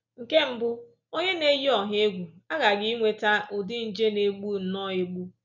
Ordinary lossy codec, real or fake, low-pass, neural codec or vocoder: none; real; 7.2 kHz; none